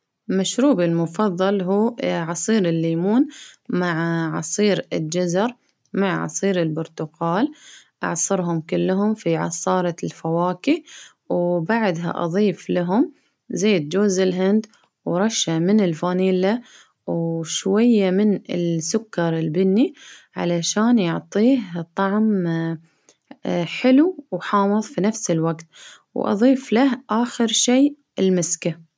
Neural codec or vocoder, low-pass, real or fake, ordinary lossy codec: none; none; real; none